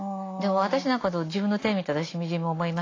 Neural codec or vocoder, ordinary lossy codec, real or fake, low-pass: none; AAC, 32 kbps; real; 7.2 kHz